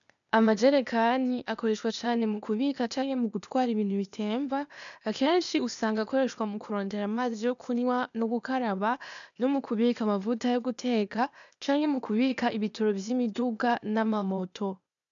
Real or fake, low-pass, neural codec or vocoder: fake; 7.2 kHz; codec, 16 kHz, 0.8 kbps, ZipCodec